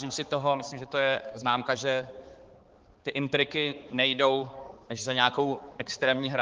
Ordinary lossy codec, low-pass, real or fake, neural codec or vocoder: Opus, 16 kbps; 7.2 kHz; fake; codec, 16 kHz, 4 kbps, X-Codec, HuBERT features, trained on balanced general audio